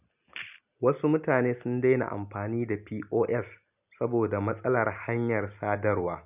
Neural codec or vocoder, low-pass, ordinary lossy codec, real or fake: none; 3.6 kHz; none; real